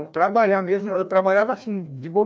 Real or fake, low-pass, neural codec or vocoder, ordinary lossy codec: fake; none; codec, 16 kHz, 1 kbps, FreqCodec, larger model; none